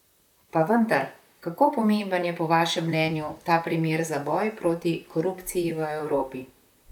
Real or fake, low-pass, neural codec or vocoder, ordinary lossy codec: fake; 19.8 kHz; vocoder, 44.1 kHz, 128 mel bands, Pupu-Vocoder; none